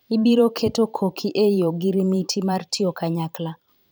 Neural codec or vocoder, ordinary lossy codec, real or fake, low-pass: vocoder, 44.1 kHz, 128 mel bands every 512 samples, BigVGAN v2; none; fake; none